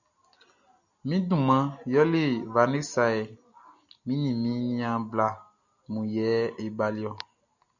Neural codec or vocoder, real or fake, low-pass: none; real; 7.2 kHz